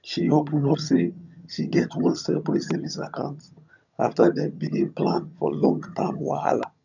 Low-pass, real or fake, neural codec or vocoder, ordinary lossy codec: 7.2 kHz; fake; vocoder, 22.05 kHz, 80 mel bands, HiFi-GAN; none